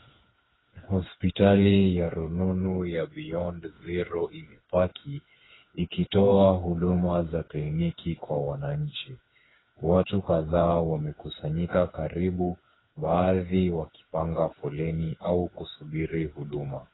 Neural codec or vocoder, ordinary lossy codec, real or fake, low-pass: codec, 16 kHz, 4 kbps, FreqCodec, smaller model; AAC, 16 kbps; fake; 7.2 kHz